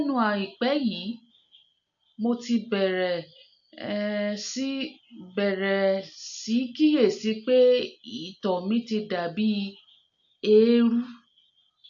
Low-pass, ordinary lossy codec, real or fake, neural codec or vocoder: 7.2 kHz; AAC, 64 kbps; real; none